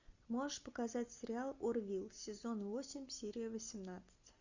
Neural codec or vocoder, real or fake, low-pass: none; real; 7.2 kHz